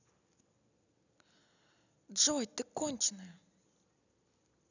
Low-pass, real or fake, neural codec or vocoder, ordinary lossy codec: 7.2 kHz; real; none; none